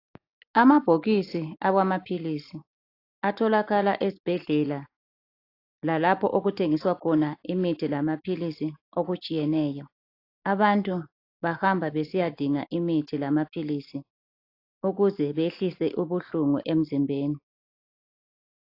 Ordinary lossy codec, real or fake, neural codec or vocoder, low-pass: AAC, 32 kbps; real; none; 5.4 kHz